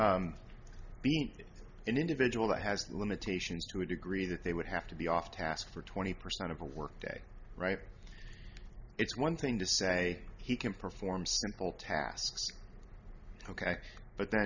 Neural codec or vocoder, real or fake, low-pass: none; real; 7.2 kHz